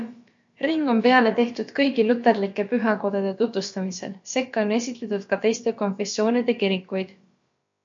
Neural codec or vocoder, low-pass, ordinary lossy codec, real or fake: codec, 16 kHz, about 1 kbps, DyCAST, with the encoder's durations; 7.2 kHz; MP3, 48 kbps; fake